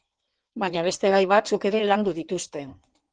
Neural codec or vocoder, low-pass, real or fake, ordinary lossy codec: codec, 16 kHz in and 24 kHz out, 1.1 kbps, FireRedTTS-2 codec; 9.9 kHz; fake; Opus, 24 kbps